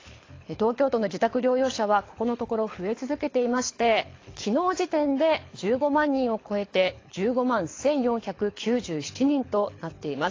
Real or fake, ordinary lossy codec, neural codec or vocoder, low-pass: fake; AAC, 32 kbps; codec, 24 kHz, 6 kbps, HILCodec; 7.2 kHz